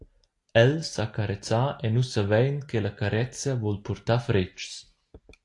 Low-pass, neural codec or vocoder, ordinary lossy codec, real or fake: 10.8 kHz; none; AAC, 48 kbps; real